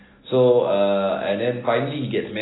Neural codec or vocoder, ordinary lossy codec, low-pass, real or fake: none; AAC, 16 kbps; 7.2 kHz; real